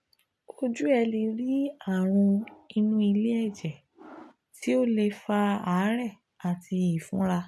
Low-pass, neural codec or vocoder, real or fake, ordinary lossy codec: none; none; real; none